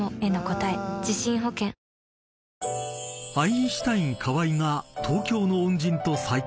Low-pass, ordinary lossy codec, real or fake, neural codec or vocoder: none; none; real; none